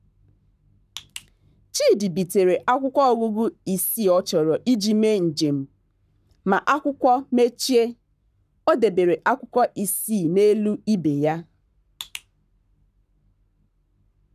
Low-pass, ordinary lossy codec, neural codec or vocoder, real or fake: 14.4 kHz; none; codec, 44.1 kHz, 7.8 kbps, Pupu-Codec; fake